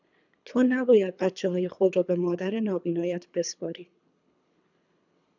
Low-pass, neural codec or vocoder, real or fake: 7.2 kHz; codec, 24 kHz, 3 kbps, HILCodec; fake